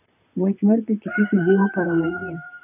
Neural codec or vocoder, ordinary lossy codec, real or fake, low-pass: vocoder, 44.1 kHz, 128 mel bands, Pupu-Vocoder; none; fake; 3.6 kHz